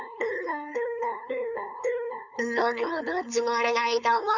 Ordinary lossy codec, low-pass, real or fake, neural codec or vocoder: none; 7.2 kHz; fake; codec, 16 kHz, 4.8 kbps, FACodec